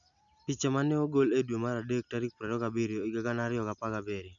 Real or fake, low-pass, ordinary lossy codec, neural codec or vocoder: real; 7.2 kHz; none; none